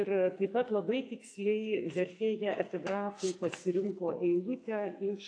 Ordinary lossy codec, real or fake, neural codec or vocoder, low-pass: AAC, 48 kbps; fake; codec, 44.1 kHz, 2.6 kbps, SNAC; 9.9 kHz